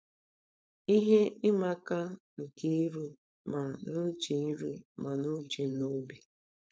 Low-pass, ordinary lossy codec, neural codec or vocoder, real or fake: none; none; codec, 16 kHz, 4.8 kbps, FACodec; fake